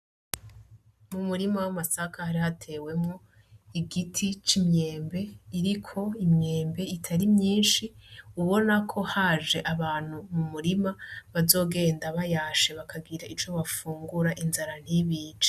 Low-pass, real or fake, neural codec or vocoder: 14.4 kHz; real; none